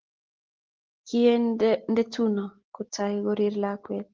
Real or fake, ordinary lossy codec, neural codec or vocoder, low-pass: real; Opus, 16 kbps; none; 7.2 kHz